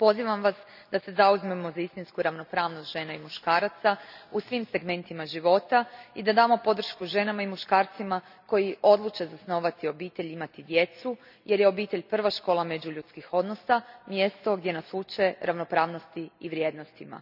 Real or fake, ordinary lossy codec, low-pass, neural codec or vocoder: real; none; 5.4 kHz; none